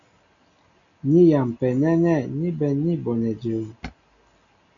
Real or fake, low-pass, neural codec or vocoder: real; 7.2 kHz; none